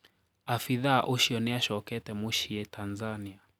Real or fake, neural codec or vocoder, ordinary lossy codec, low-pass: real; none; none; none